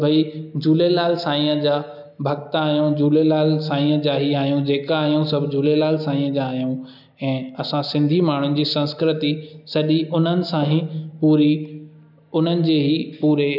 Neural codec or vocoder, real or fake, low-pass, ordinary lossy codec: none; real; 5.4 kHz; none